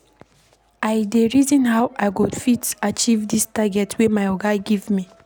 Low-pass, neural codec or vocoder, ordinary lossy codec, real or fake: none; none; none; real